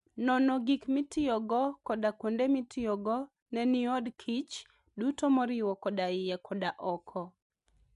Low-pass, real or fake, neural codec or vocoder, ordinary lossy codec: 10.8 kHz; real; none; MP3, 64 kbps